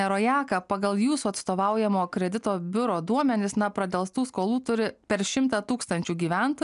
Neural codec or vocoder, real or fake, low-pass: none; real; 10.8 kHz